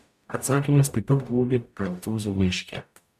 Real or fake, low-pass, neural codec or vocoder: fake; 14.4 kHz; codec, 44.1 kHz, 0.9 kbps, DAC